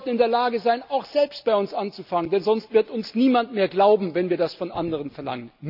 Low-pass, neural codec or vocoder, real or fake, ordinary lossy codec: 5.4 kHz; none; real; none